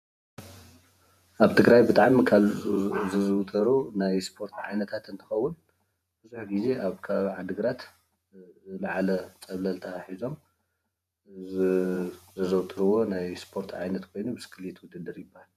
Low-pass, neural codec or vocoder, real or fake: 14.4 kHz; vocoder, 48 kHz, 128 mel bands, Vocos; fake